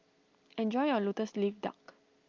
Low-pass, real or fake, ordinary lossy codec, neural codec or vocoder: 7.2 kHz; real; Opus, 24 kbps; none